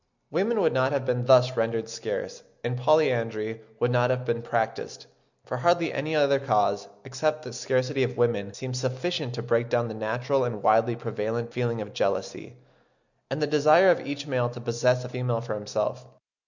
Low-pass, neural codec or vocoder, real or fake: 7.2 kHz; none; real